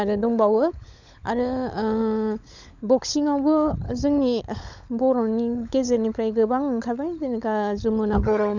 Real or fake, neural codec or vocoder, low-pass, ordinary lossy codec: fake; codec, 16 kHz, 16 kbps, FunCodec, trained on LibriTTS, 50 frames a second; 7.2 kHz; none